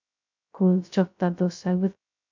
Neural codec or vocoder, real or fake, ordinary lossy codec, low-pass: codec, 16 kHz, 0.2 kbps, FocalCodec; fake; MP3, 64 kbps; 7.2 kHz